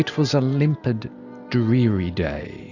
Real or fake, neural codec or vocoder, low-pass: real; none; 7.2 kHz